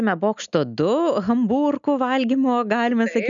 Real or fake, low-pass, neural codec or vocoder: real; 7.2 kHz; none